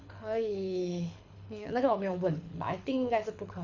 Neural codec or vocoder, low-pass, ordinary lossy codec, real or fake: codec, 24 kHz, 6 kbps, HILCodec; 7.2 kHz; none; fake